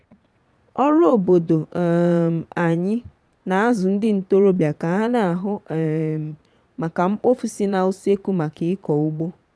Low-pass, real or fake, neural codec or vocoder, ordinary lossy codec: none; fake; vocoder, 22.05 kHz, 80 mel bands, Vocos; none